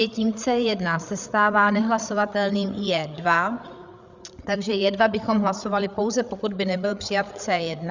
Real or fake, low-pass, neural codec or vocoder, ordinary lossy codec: fake; 7.2 kHz; codec, 16 kHz, 8 kbps, FreqCodec, larger model; Opus, 64 kbps